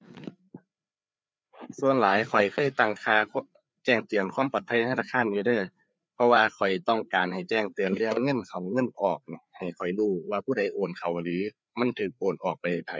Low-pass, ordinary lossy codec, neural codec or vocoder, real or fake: none; none; codec, 16 kHz, 4 kbps, FreqCodec, larger model; fake